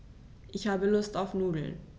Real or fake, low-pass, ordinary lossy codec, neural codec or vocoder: real; none; none; none